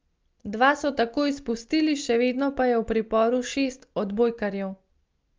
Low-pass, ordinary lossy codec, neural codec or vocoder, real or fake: 7.2 kHz; Opus, 24 kbps; none; real